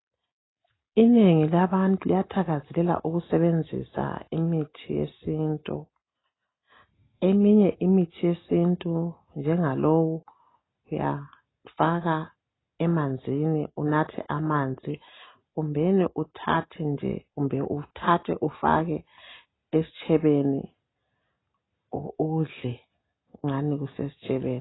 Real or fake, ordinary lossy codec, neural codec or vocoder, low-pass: real; AAC, 16 kbps; none; 7.2 kHz